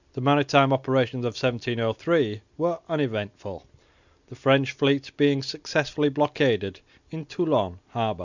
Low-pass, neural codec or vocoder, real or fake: 7.2 kHz; none; real